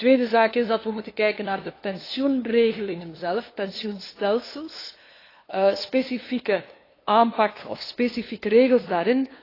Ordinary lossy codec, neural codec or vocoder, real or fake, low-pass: AAC, 24 kbps; codec, 16 kHz, 2 kbps, FunCodec, trained on LibriTTS, 25 frames a second; fake; 5.4 kHz